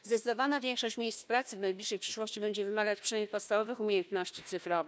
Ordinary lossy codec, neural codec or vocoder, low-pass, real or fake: none; codec, 16 kHz, 1 kbps, FunCodec, trained on Chinese and English, 50 frames a second; none; fake